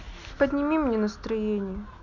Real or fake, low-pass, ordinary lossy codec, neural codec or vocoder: real; 7.2 kHz; none; none